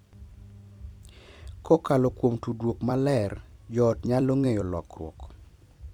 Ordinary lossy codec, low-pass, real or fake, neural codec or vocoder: MP3, 96 kbps; 19.8 kHz; fake; vocoder, 44.1 kHz, 128 mel bands every 256 samples, BigVGAN v2